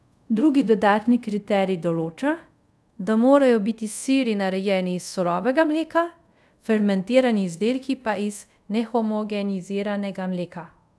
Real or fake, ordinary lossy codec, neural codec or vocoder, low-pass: fake; none; codec, 24 kHz, 0.5 kbps, DualCodec; none